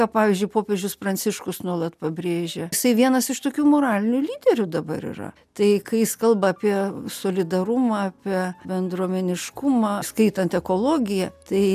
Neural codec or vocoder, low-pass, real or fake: none; 14.4 kHz; real